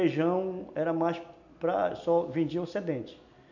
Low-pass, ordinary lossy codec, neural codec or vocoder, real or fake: 7.2 kHz; none; none; real